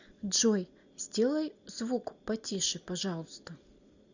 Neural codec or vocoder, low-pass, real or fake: none; 7.2 kHz; real